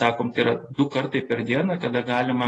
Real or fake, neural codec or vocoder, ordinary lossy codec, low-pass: real; none; AAC, 32 kbps; 10.8 kHz